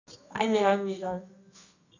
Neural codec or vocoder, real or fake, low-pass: codec, 24 kHz, 0.9 kbps, WavTokenizer, medium music audio release; fake; 7.2 kHz